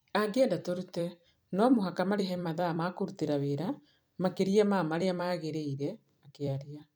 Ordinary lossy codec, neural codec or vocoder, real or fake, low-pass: none; vocoder, 44.1 kHz, 128 mel bands every 256 samples, BigVGAN v2; fake; none